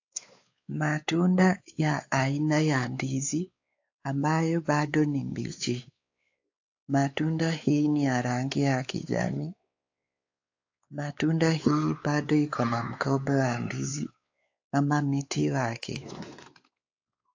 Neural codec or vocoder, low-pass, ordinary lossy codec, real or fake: codec, 16 kHz, 4 kbps, X-Codec, WavLM features, trained on Multilingual LibriSpeech; 7.2 kHz; AAC, 48 kbps; fake